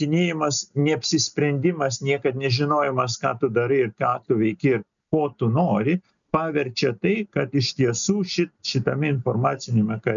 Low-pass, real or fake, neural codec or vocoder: 7.2 kHz; real; none